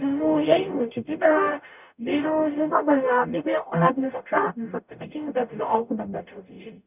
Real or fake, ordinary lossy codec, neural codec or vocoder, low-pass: fake; none; codec, 44.1 kHz, 0.9 kbps, DAC; 3.6 kHz